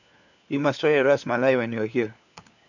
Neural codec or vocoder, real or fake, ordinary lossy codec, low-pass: codec, 16 kHz, 4 kbps, FunCodec, trained on LibriTTS, 50 frames a second; fake; none; 7.2 kHz